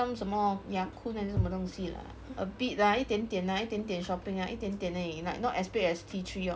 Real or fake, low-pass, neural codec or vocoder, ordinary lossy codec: real; none; none; none